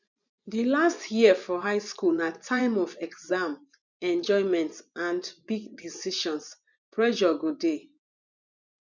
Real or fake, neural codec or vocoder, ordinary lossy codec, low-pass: fake; vocoder, 24 kHz, 100 mel bands, Vocos; none; 7.2 kHz